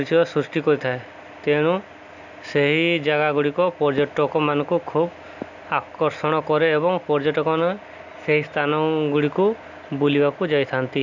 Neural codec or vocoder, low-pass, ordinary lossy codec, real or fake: none; 7.2 kHz; none; real